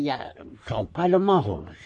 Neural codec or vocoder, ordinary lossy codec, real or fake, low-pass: codec, 44.1 kHz, 3.4 kbps, Pupu-Codec; MP3, 48 kbps; fake; 10.8 kHz